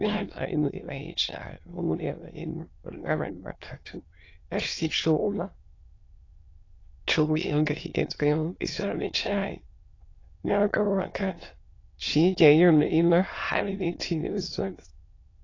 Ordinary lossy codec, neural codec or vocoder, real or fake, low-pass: AAC, 32 kbps; autoencoder, 22.05 kHz, a latent of 192 numbers a frame, VITS, trained on many speakers; fake; 7.2 kHz